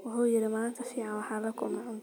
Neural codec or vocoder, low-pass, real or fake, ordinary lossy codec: none; none; real; none